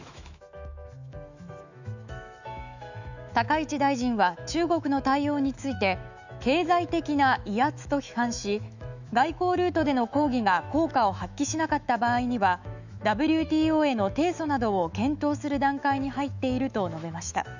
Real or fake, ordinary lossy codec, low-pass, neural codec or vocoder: fake; none; 7.2 kHz; autoencoder, 48 kHz, 128 numbers a frame, DAC-VAE, trained on Japanese speech